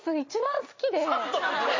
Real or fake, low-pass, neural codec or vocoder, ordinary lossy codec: real; 7.2 kHz; none; MP3, 32 kbps